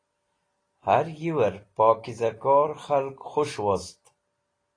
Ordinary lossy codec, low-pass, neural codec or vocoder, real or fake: AAC, 32 kbps; 9.9 kHz; none; real